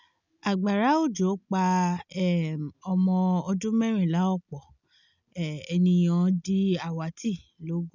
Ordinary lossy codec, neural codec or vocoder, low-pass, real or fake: none; none; 7.2 kHz; real